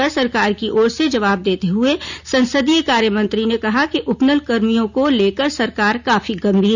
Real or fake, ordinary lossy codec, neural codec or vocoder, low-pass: real; none; none; 7.2 kHz